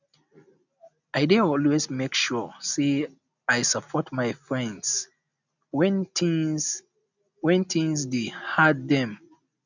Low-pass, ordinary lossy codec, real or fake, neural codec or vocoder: 7.2 kHz; none; real; none